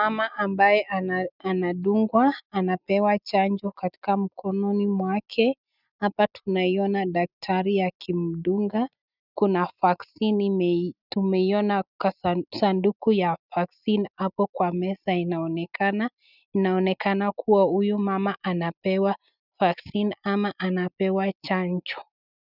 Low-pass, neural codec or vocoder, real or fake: 5.4 kHz; none; real